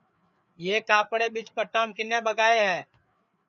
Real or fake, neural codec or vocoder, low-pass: fake; codec, 16 kHz, 4 kbps, FreqCodec, larger model; 7.2 kHz